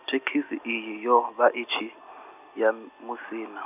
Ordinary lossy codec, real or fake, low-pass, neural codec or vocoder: none; real; 3.6 kHz; none